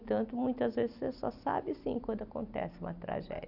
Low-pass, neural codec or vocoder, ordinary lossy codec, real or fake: 5.4 kHz; none; none; real